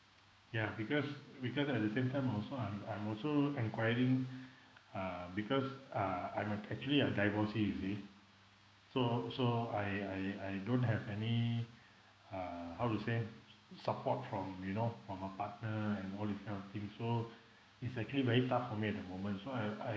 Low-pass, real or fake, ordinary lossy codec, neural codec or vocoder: none; fake; none; codec, 16 kHz, 6 kbps, DAC